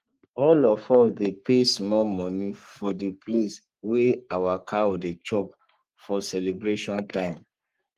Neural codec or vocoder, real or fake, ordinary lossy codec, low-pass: codec, 44.1 kHz, 3.4 kbps, Pupu-Codec; fake; Opus, 24 kbps; 14.4 kHz